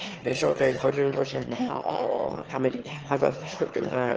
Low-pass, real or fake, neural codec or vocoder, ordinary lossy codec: 7.2 kHz; fake; autoencoder, 22.05 kHz, a latent of 192 numbers a frame, VITS, trained on one speaker; Opus, 16 kbps